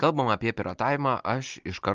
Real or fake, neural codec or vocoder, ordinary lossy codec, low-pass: real; none; Opus, 24 kbps; 7.2 kHz